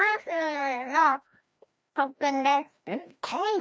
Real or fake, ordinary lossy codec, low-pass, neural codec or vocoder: fake; none; none; codec, 16 kHz, 1 kbps, FreqCodec, larger model